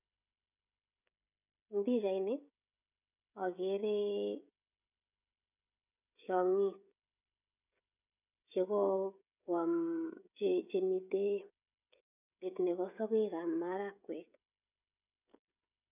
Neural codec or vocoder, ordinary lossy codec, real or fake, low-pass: codec, 16 kHz, 16 kbps, FreqCodec, smaller model; none; fake; 3.6 kHz